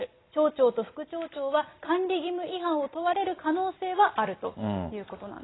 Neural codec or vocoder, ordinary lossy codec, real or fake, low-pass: none; AAC, 16 kbps; real; 7.2 kHz